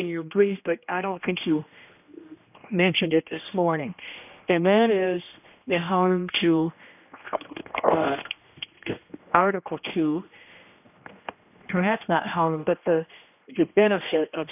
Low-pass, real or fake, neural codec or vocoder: 3.6 kHz; fake; codec, 16 kHz, 1 kbps, X-Codec, HuBERT features, trained on general audio